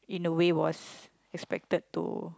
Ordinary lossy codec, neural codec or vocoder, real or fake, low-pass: none; none; real; none